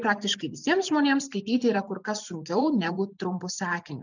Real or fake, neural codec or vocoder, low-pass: fake; vocoder, 44.1 kHz, 128 mel bands every 512 samples, BigVGAN v2; 7.2 kHz